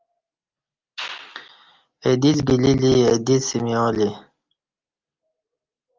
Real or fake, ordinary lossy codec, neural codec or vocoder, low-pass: real; Opus, 32 kbps; none; 7.2 kHz